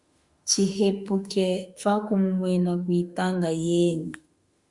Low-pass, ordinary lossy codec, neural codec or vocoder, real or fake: 10.8 kHz; Opus, 64 kbps; autoencoder, 48 kHz, 32 numbers a frame, DAC-VAE, trained on Japanese speech; fake